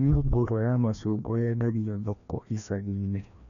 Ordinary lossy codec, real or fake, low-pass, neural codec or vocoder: none; fake; 7.2 kHz; codec, 16 kHz, 1 kbps, FreqCodec, larger model